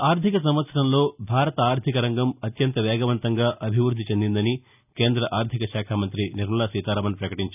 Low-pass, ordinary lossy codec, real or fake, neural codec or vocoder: 3.6 kHz; none; real; none